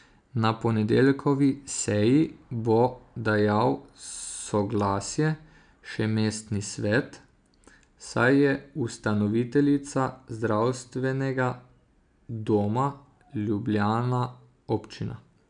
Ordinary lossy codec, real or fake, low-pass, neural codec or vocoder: MP3, 96 kbps; real; 9.9 kHz; none